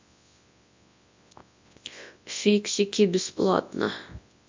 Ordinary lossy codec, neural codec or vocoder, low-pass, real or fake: MP3, 64 kbps; codec, 24 kHz, 0.9 kbps, WavTokenizer, large speech release; 7.2 kHz; fake